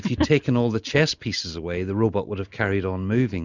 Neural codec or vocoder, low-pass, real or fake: none; 7.2 kHz; real